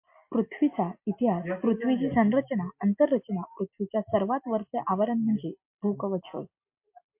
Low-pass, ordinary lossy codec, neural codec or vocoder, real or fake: 3.6 kHz; MP3, 32 kbps; none; real